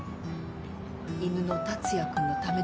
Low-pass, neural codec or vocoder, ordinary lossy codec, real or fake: none; none; none; real